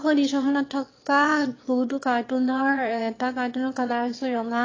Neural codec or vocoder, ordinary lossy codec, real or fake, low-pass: autoencoder, 22.05 kHz, a latent of 192 numbers a frame, VITS, trained on one speaker; AAC, 32 kbps; fake; 7.2 kHz